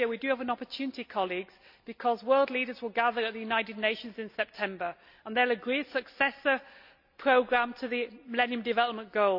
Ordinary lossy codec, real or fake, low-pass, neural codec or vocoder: none; real; 5.4 kHz; none